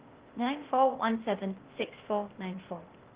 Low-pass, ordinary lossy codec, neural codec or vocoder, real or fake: 3.6 kHz; Opus, 16 kbps; codec, 16 kHz, 0.8 kbps, ZipCodec; fake